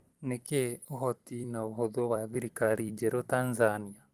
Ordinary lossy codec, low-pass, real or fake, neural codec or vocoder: Opus, 24 kbps; 14.4 kHz; fake; vocoder, 44.1 kHz, 128 mel bands every 256 samples, BigVGAN v2